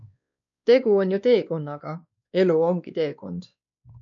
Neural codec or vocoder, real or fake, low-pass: codec, 16 kHz, 2 kbps, X-Codec, WavLM features, trained on Multilingual LibriSpeech; fake; 7.2 kHz